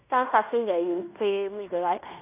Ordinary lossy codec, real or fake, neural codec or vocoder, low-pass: none; fake; codec, 16 kHz in and 24 kHz out, 0.9 kbps, LongCat-Audio-Codec, fine tuned four codebook decoder; 3.6 kHz